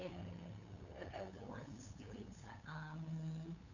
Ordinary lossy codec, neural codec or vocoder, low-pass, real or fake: none; codec, 16 kHz, 8 kbps, FunCodec, trained on LibriTTS, 25 frames a second; 7.2 kHz; fake